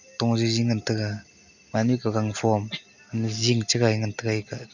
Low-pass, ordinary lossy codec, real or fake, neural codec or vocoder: 7.2 kHz; none; real; none